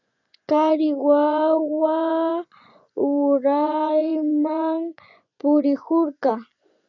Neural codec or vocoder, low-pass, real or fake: vocoder, 24 kHz, 100 mel bands, Vocos; 7.2 kHz; fake